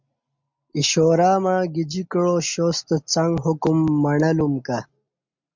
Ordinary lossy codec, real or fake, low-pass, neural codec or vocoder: MP3, 64 kbps; real; 7.2 kHz; none